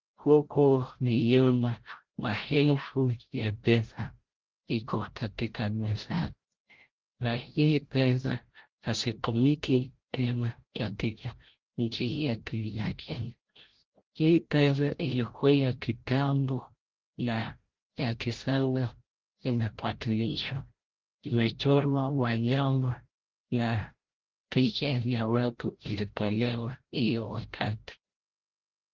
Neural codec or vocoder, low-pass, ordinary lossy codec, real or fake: codec, 16 kHz, 0.5 kbps, FreqCodec, larger model; 7.2 kHz; Opus, 16 kbps; fake